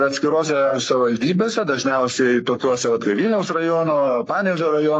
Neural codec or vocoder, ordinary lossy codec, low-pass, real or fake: codec, 44.1 kHz, 3.4 kbps, Pupu-Codec; AAC, 48 kbps; 9.9 kHz; fake